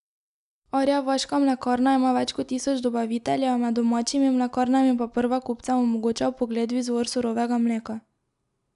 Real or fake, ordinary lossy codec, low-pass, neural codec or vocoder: real; none; 10.8 kHz; none